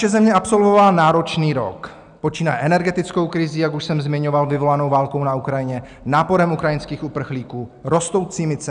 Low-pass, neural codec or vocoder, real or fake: 9.9 kHz; none; real